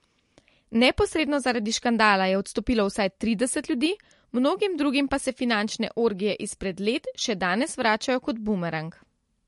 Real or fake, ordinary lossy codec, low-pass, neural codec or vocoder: real; MP3, 48 kbps; 14.4 kHz; none